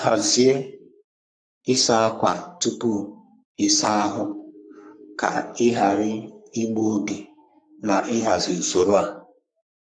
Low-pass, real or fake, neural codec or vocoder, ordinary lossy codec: 9.9 kHz; fake; codec, 44.1 kHz, 3.4 kbps, Pupu-Codec; none